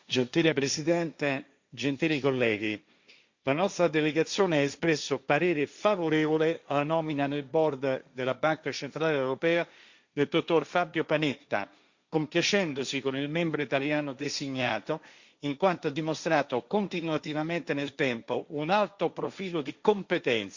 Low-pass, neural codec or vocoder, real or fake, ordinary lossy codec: 7.2 kHz; codec, 16 kHz, 1.1 kbps, Voila-Tokenizer; fake; Opus, 64 kbps